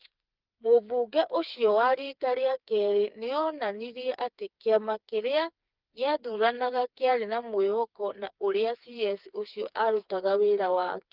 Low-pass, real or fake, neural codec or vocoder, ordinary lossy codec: 5.4 kHz; fake; codec, 16 kHz, 4 kbps, FreqCodec, smaller model; Opus, 32 kbps